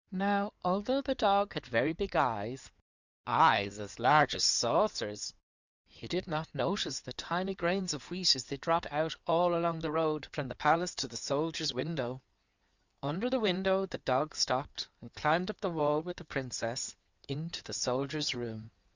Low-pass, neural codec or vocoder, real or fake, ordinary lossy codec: 7.2 kHz; codec, 16 kHz in and 24 kHz out, 2.2 kbps, FireRedTTS-2 codec; fake; Opus, 64 kbps